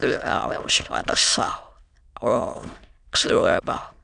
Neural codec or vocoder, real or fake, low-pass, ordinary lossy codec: autoencoder, 22.05 kHz, a latent of 192 numbers a frame, VITS, trained on many speakers; fake; 9.9 kHz; none